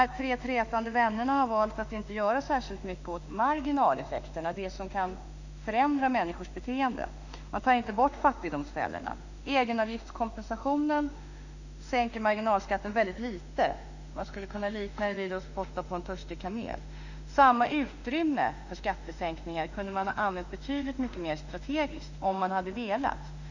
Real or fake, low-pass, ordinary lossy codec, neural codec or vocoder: fake; 7.2 kHz; none; autoencoder, 48 kHz, 32 numbers a frame, DAC-VAE, trained on Japanese speech